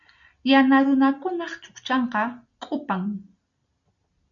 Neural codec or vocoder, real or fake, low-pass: none; real; 7.2 kHz